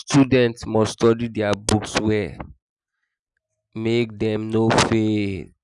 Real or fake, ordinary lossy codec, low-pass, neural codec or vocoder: real; none; 10.8 kHz; none